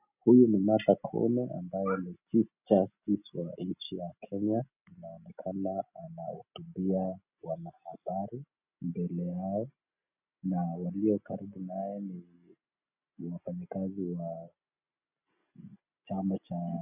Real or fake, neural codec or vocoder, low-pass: real; none; 3.6 kHz